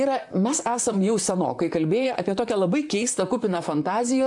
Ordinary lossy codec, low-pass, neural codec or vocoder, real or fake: AAC, 64 kbps; 10.8 kHz; vocoder, 44.1 kHz, 128 mel bands, Pupu-Vocoder; fake